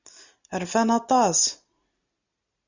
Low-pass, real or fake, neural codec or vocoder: 7.2 kHz; real; none